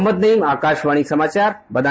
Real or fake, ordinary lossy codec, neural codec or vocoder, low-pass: real; none; none; none